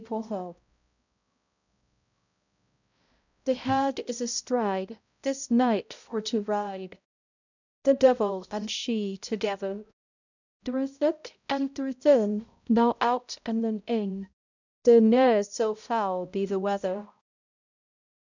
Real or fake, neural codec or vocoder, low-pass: fake; codec, 16 kHz, 0.5 kbps, X-Codec, HuBERT features, trained on balanced general audio; 7.2 kHz